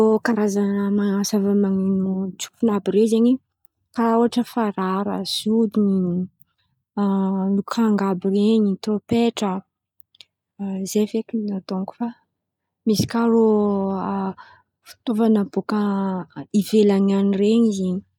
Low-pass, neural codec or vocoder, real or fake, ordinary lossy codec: 19.8 kHz; none; real; none